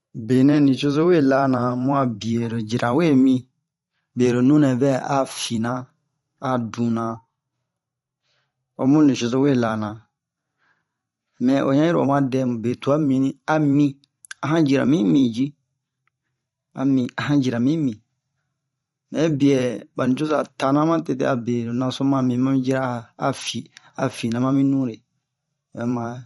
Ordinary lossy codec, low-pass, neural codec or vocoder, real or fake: MP3, 48 kbps; 19.8 kHz; vocoder, 44.1 kHz, 128 mel bands every 512 samples, BigVGAN v2; fake